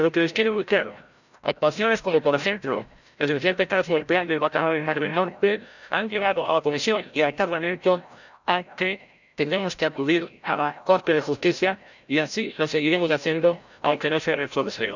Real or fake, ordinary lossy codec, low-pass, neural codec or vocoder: fake; none; 7.2 kHz; codec, 16 kHz, 0.5 kbps, FreqCodec, larger model